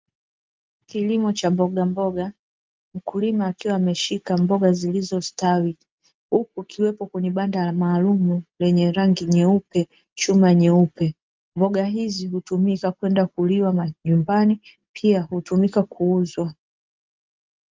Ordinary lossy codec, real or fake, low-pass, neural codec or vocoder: Opus, 32 kbps; real; 7.2 kHz; none